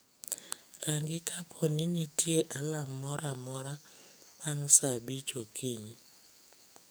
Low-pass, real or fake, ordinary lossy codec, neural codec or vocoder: none; fake; none; codec, 44.1 kHz, 2.6 kbps, SNAC